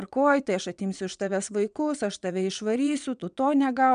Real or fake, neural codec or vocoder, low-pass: fake; vocoder, 22.05 kHz, 80 mel bands, WaveNeXt; 9.9 kHz